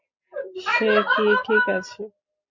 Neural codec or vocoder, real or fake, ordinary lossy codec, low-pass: none; real; MP3, 48 kbps; 7.2 kHz